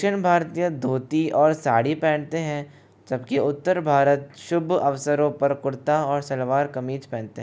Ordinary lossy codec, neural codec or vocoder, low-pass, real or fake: none; none; none; real